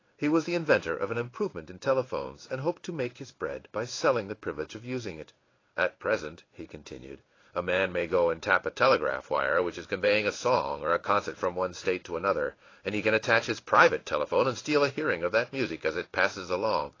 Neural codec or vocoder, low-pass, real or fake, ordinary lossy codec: codec, 16 kHz in and 24 kHz out, 1 kbps, XY-Tokenizer; 7.2 kHz; fake; AAC, 32 kbps